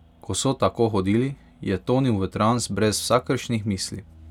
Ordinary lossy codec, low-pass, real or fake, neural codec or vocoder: none; 19.8 kHz; real; none